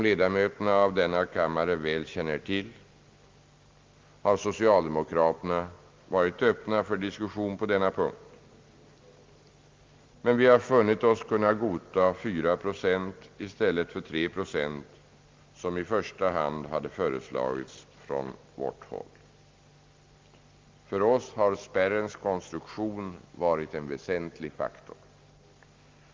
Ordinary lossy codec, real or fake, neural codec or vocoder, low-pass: Opus, 32 kbps; real; none; 7.2 kHz